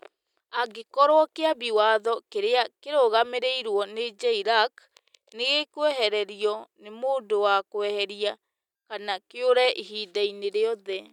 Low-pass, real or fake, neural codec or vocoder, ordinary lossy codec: 19.8 kHz; real; none; none